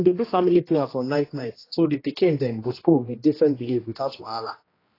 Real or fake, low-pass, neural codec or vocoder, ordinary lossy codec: fake; 5.4 kHz; codec, 16 kHz, 1 kbps, X-Codec, HuBERT features, trained on general audio; AAC, 24 kbps